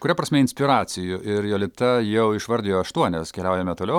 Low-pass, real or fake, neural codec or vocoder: 19.8 kHz; real; none